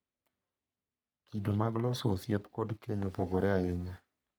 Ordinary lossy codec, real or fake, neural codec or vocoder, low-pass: none; fake; codec, 44.1 kHz, 3.4 kbps, Pupu-Codec; none